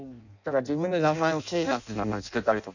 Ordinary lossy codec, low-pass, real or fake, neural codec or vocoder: none; 7.2 kHz; fake; codec, 16 kHz in and 24 kHz out, 0.6 kbps, FireRedTTS-2 codec